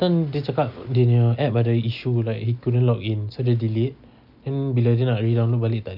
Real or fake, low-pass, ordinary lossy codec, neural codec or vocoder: real; 5.4 kHz; none; none